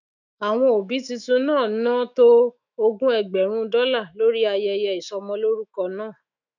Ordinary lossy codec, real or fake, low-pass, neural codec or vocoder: none; fake; 7.2 kHz; autoencoder, 48 kHz, 128 numbers a frame, DAC-VAE, trained on Japanese speech